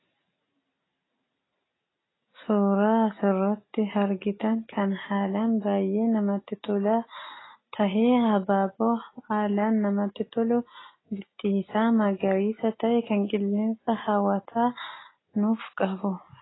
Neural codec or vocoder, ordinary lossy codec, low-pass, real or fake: none; AAC, 16 kbps; 7.2 kHz; real